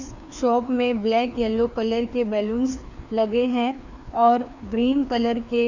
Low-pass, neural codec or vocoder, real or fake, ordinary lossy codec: 7.2 kHz; codec, 16 kHz, 2 kbps, FreqCodec, larger model; fake; Opus, 64 kbps